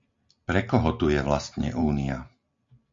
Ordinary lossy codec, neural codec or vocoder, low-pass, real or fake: MP3, 48 kbps; none; 7.2 kHz; real